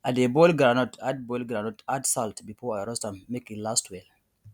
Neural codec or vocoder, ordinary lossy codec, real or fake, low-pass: none; none; real; 19.8 kHz